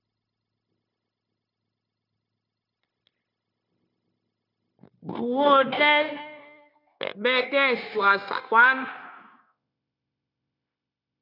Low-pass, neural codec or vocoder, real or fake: 5.4 kHz; codec, 16 kHz, 0.9 kbps, LongCat-Audio-Codec; fake